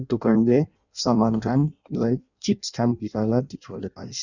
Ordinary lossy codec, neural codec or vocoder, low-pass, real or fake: none; codec, 16 kHz in and 24 kHz out, 0.6 kbps, FireRedTTS-2 codec; 7.2 kHz; fake